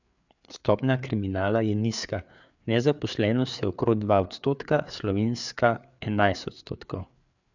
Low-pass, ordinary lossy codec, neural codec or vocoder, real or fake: 7.2 kHz; none; codec, 16 kHz, 4 kbps, FreqCodec, larger model; fake